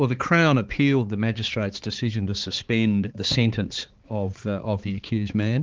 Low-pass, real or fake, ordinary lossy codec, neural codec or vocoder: 7.2 kHz; fake; Opus, 16 kbps; codec, 16 kHz, 4 kbps, X-Codec, HuBERT features, trained on balanced general audio